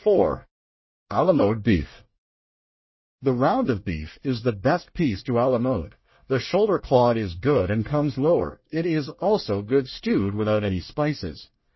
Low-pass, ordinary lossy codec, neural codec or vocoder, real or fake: 7.2 kHz; MP3, 24 kbps; codec, 24 kHz, 1 kbps, SNAC; fake